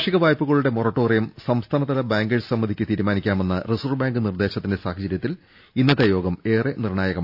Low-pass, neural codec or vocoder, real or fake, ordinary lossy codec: 5.4 kHz; none; real; AAC, 48 kbps